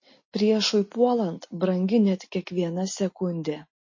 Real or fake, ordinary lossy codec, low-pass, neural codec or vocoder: real; MP3, 32 kbps; 7.2 kHz; none